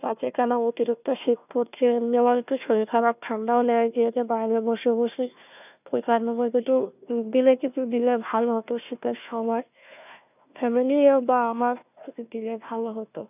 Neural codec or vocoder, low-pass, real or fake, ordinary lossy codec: codec, 16 kHz, 1 kbps, FunCodec, trained on Chinese and English, 50 frames a second; 3.6 kHz; fake; none